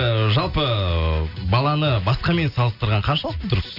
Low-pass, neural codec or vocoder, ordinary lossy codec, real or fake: 5.4 kHz; none; Opus, 64 kbps; real